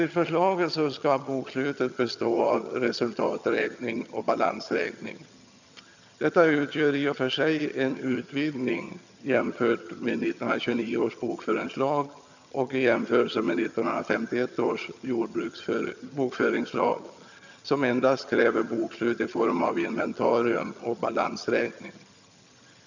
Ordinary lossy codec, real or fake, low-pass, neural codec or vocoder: none; fake; 7.2 kHz; vocoder, 22.05 kHz, 80 mel bands, HiFi-GAN